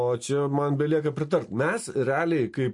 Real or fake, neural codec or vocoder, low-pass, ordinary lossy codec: real; none; 10.8 kHz; MP3, 48 kbps